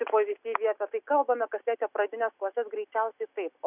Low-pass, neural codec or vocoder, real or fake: 3.6 kHz; none; real